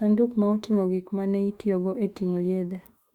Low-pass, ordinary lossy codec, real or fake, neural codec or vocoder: 19.8 kHz; Opus, 64 kbps; fake; autoencoder, 48 kHz, 32 numbers a frame, DAC-VAE, trained on Japanese speech